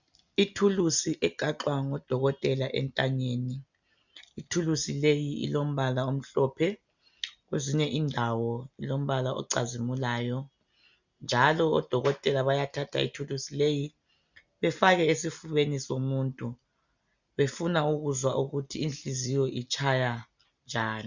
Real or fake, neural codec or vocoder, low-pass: real; none; 7.2 kHz